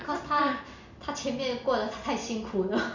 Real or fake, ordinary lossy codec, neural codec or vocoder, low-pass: real; none; none; 7.2 kHz